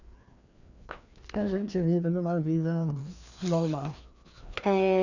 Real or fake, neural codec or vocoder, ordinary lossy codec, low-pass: fake; codec, 16 kHz, 2 kbps, FreqCodec, larger model; none; 7.2 kHz